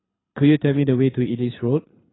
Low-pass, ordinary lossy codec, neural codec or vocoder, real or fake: 7.2 kHz; AAC, 16 kbps; codec, 24 kHz, 6 kbps, HILCodec; fake